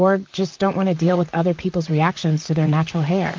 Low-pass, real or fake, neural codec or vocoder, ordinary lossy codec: 7.2 kHz; fake; vocoder, 44.1 kHz, 128 mel bands, Pupu-Vocoder; Opus, 24 kbps